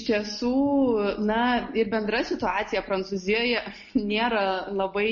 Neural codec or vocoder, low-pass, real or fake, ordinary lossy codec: none; 7.2 kHz; real; MP3, 32 kbps